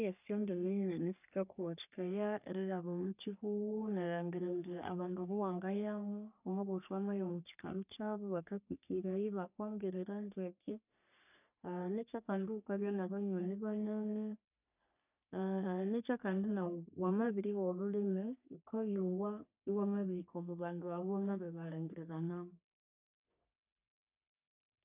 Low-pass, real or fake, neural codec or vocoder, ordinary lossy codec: 3.6 kHz; fake; codec, 44.1 kHz, 3.4 kbps, Pupu-Codec; none